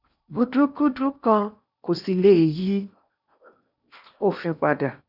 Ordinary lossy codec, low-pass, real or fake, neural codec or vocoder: none; 5.4 kHz; fake; codec, 16 kHz in and 24 kHz out, 0.8 kbps, FocalCodec, streaming, 65536 codes